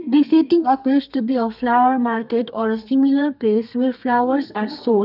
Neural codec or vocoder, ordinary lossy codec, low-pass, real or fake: codec, 32 kHz, 1.9 kbps, SNAC; none; 5.4 kHz; fake